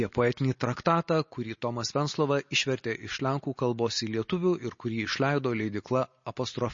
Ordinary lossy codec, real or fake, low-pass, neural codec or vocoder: MP3, 32 kbps; real; 7.2 kHz; none